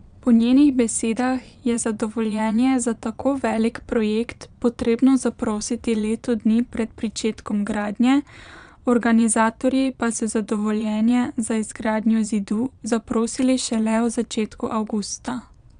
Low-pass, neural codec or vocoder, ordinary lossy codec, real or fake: 9.9 kHz; vocoder, 22.05 kHz, 80 mel bands, Vocos; none; fake